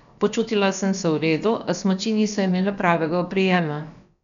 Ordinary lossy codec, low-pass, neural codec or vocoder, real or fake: none; 7.2 kHz; codec, 16 kHz, about 1 kbps, DyCAST, with the encoder's durations; fake